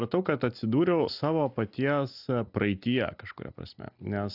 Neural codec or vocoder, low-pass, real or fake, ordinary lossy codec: none; 5.4 kHz; real; AAC, 48 kbps